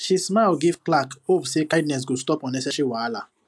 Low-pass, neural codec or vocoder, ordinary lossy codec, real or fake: none; none; none; real